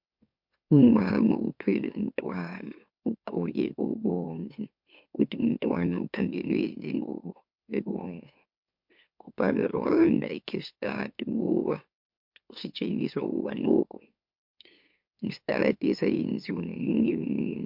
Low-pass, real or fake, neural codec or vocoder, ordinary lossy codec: 5.4 kHz; fake; autoencoder, 44.1 kHz, a latent of 192 numbers a frame, MeloTTS; MP3, 48 kbps